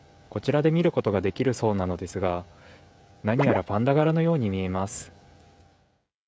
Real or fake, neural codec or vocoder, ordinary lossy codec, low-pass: fake; codec, 16 kHz, 16 kbps, FreqCodec, smaller model; none; none